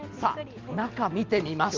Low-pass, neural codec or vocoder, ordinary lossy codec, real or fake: 7.2 kHz; none; Opus, 32 kbps; real